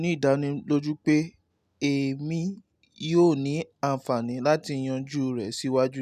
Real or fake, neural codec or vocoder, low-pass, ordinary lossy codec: real; none; 14.4 kHz; none